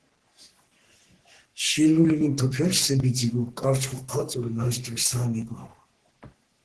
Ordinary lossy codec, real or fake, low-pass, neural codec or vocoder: Opus, 16 kbps; fake; 10.8 kHz; codec, 44.1 kHz, 3.4 kbps, Pupu-Codec